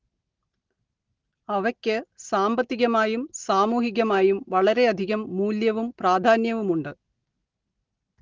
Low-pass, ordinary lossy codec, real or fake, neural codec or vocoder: 7.2 kHz; Opus, 16 kbps; real; none